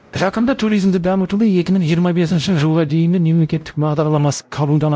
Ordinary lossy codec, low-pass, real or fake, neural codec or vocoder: none; none; fake; codec, 16 kHz, 0.5 kbps, X-Codec, WavLM features, trained on Multilingual LibriSpeech